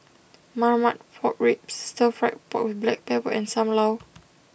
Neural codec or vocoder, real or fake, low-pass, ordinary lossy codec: none; real; none; none